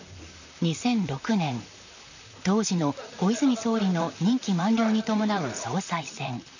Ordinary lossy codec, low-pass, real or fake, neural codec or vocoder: none; 7.2 kHz; fake; vocoder, 44.1 kHz, 128 mel bands, Pupu-Vocoder